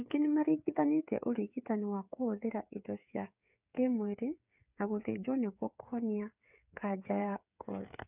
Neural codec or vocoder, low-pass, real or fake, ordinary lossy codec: codec, 16 kHz, 8 kbps, FreqCodec, smaller model; 3.6 kHz; fake; none